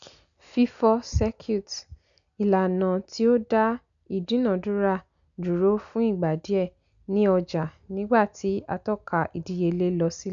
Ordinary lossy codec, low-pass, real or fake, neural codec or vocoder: MP3, 96 kbps; 7.2 kHz; real; none